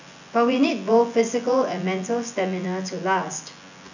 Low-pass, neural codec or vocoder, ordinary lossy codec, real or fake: 7.2 kHz; vocoder, 24 kHz, 100 mel bands, Vocos; none; fake